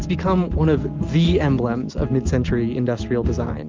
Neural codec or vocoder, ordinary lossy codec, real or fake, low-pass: none; Opus, 32 kbps; real; 7.2 kHz